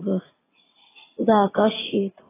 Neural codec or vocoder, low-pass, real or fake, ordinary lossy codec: vocoder, 22.05 kHz, 80 mel bands, WaveNeXt; 3.6 kHz; fake; AAC, 16 kbps